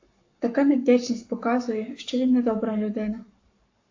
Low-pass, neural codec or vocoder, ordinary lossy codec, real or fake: 7.2 kHz; codec, 44.1 kHz, 7.8 kbps, Pupu-Codec; AAC, 48 kbps; fake